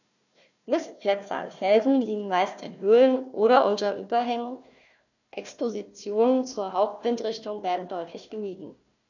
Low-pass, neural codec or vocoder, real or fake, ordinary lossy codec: 7.2 kHz; codec, 16 kHz, 1 kbps, FunCodec, trained on Chinese and English, 50 frames a second; fake; none